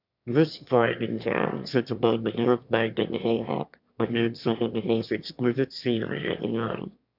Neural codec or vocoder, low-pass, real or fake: autoencoder, 22.05 kHz, a latent of 192 numbers a frame, VITS, trained on one speaker; 5.4 kHz; fake